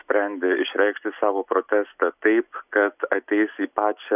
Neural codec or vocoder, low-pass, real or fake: none; 3.6 kHz; real